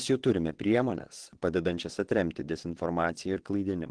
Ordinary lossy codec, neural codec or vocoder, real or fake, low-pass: Opus, 16 kbps; vocoder, 22.05 kHz, 80 mel bands, WaveNeXt; fake; 9.9 kHz